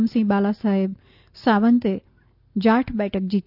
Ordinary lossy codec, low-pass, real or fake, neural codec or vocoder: none; 5.4 kHz; real; none